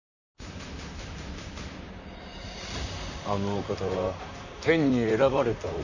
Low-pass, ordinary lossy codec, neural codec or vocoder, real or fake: 7.2 kHz; none; vocoder, 44.1 kHz, 128 mel bands, Pupu-Vocoder; fake